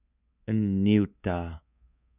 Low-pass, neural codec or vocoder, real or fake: 3.6 kHz; codec, 24 kHz, 3.1 kbps, DualCodec; fake